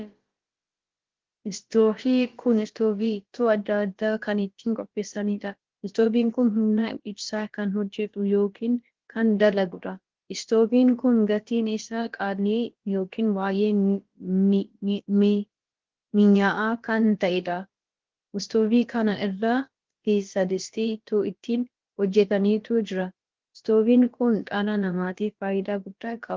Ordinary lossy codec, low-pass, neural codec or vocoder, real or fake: Opus, 16 kbps; 7.2 kHz; codec, 16 kHz, about 1 kbps, DyCAST, with the encoder's durations; fake